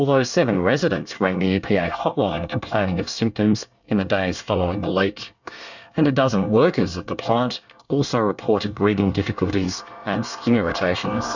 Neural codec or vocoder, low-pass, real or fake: codec, 24 kHz, 1 kbps, SNAC; 7.2 kHz; fake